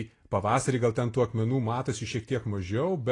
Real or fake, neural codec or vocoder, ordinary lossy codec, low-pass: real; none; AAC, 32 kbps; 10.8 kHz